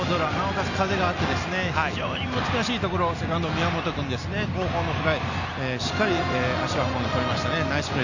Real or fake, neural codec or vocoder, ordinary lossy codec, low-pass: real; none; none; 7.2 kHz